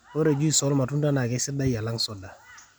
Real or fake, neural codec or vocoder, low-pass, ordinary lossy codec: real; none; none; none